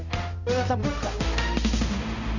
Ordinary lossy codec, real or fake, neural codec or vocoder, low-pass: none; fake; codec, 16 kHz, 0.5 kbps, X-Codec, HuBERT features, trained on balanced general audio; 7.2 kHz